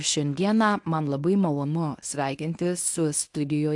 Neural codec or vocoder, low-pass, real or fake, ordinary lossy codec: codec, 24 kHz, 0.9 kbps, WavTokenizer, medium speech release version 1; 10.8 kHz; fake; AAC, 64 kbps